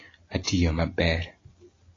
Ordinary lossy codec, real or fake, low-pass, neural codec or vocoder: AAC, 32 kbps; real; 7.2 kHz; none